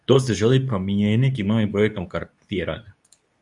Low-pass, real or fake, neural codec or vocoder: 10.8 kHz; fake; codec, 24 kHz, 0.9 kbps, WavTokenizer, medium speech release version 2